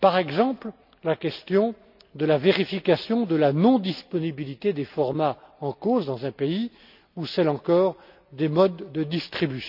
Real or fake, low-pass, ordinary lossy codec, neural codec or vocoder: real; 5.4 kHz; none; none